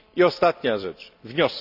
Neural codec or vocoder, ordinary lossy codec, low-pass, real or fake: none; none; 5.4 kHz; real